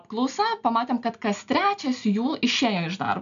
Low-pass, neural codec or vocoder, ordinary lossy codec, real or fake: 7.2 kHz; none; MP3, 96 kbps; real